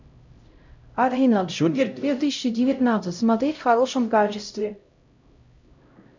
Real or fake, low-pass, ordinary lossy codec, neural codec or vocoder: fake; 7.2 kHz; MP3, 64 kbps; codec, 16 kHz, 0.5 kbps, X-Codec, HuBERT features, trained on LibriSpeech